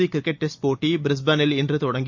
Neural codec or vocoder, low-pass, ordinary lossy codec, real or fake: none; 7.2 kHz; MP3, 64 kbps; real